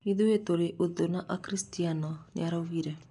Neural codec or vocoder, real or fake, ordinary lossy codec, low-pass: none; real; none; 10.8 kHz